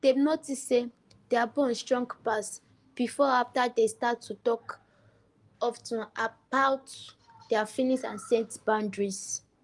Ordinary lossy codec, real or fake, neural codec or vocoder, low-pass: Opus, 24 kbps; real; none; 10.8 kHz